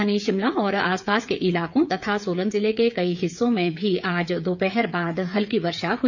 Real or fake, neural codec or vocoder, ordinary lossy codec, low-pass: fake; codec, 16 kHz, 8 kbps, FreqCodec, smaller model; none; 7.2 kHz